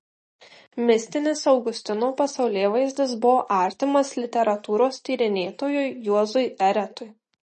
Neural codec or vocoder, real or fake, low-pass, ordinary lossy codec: none; real; 10.8 kHz; MP3, 32 kbps